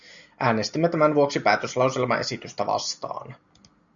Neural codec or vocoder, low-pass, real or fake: none; 7.2 kHz; real